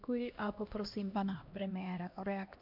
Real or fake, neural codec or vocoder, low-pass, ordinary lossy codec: fake; codec, 16 kHz, 1 kbps, X-Codec, HuBERT features, trained on LibriSpeech; 5.4 kHz; MP3, 48 kbps